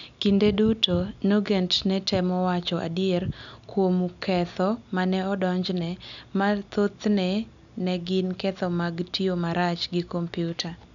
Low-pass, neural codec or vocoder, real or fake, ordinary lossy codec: 7.2 kHz; none; real; none